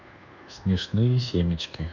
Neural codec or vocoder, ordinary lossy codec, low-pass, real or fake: codec, 24 kHz, 1.2 kbps, DualCodec; none; 7.2 kHz; fake